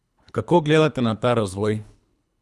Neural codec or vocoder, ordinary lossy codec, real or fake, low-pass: codec, 24 kHz, 3 kbps, HILCodec; none; fake; none